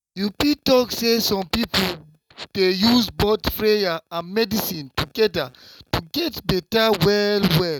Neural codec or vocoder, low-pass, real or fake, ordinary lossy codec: none; 19.8 kHz; real; none